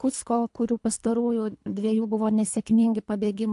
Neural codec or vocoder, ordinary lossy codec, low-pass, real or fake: codec, 24 kHz, 3 kbps, HILCodec; AAC, 64 kbps; 10.8 kHz; fake